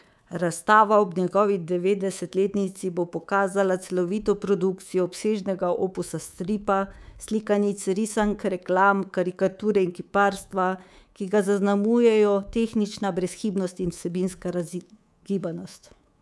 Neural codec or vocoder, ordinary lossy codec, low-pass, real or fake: codec, 24 kHz, 3.1 kbps, DualCodec; none; none; fake